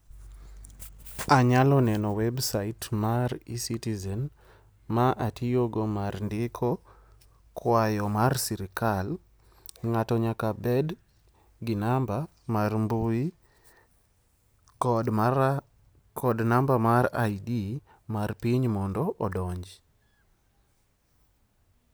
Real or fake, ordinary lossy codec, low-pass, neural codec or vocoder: real; none; none; none